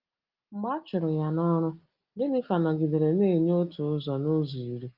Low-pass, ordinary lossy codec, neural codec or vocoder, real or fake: 5.4 kHz; Opus, 32 kbps; none; real